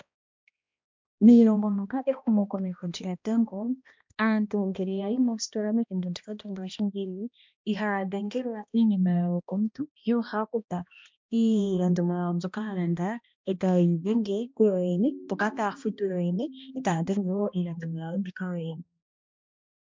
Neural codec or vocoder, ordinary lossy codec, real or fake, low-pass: codec, 16 kHz, 1 kbps, X-Codec, HuBERT features, trained on balanced general audio; MP3, 64 kbps; fake; 7.2 kHz